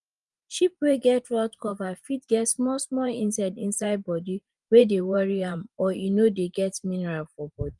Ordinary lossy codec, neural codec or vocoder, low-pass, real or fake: Opus, 32 kbps; vocoder, 44.1 kHz, 128 mel bands every 512 samples, BigVGAN v2; 10.8 kHz; fake